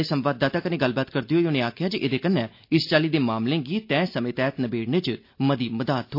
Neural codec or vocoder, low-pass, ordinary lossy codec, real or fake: none; 5.4 kHz; MP3, 32 kbps; real